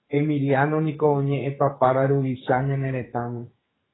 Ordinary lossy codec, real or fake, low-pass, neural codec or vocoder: AAC, 16 kbps; fake; 7.2 kHz; codec, 44.1 kHz, 2.6 kbps, SNAC